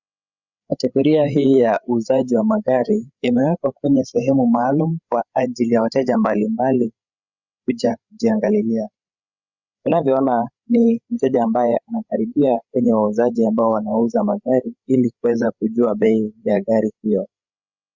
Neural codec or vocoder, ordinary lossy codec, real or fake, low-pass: codec, 16 kHz, 8 kbps, FreqCodec, larger model; Opus, 64 kbps; fake; 7.2 kHz